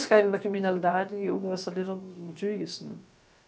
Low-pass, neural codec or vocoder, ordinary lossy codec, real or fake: none; codec, 16 kHz, about 1 kbps, DyCAST, with the encoder's durations; none; fake